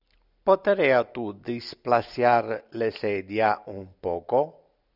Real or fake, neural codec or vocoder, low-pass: real; none; 5.4 kHz